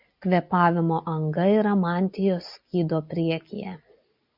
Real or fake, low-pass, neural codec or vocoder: real; 5.4 kHz; none